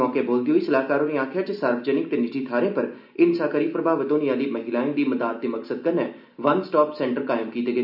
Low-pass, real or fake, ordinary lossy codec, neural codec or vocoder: 5.4 kHz; real; none; none